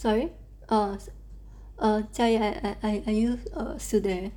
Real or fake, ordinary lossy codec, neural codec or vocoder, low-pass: real; none; none; 19.8 kHz